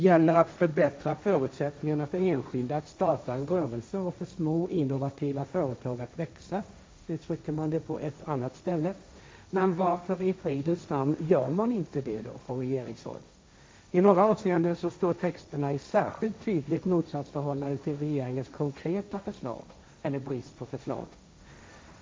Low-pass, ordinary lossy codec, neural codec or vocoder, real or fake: none; none; codec, 16 kHz, 1.1 kbps, Voila-Tokenizer; fake